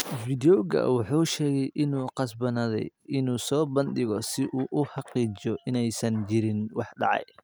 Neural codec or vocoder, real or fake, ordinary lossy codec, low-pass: none; real; none; none